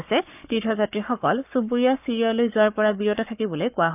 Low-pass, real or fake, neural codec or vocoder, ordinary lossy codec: 3.6 kHz; fake; codec, 44.1 kHz, 7.8 kbps, Pupu-Codec; none